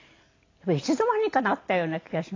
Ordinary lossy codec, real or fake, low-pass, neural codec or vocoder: AAC, 32 kbps; real; 7.2 kHz; none